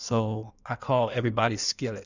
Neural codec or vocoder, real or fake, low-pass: codec, 16 kHz in and 24 kHz out, 1.1 kbps, FireRedTTS-2 codec; fake; 7.2 kHz